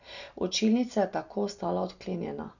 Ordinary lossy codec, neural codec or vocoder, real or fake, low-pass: none; none; real; 7.2 kHz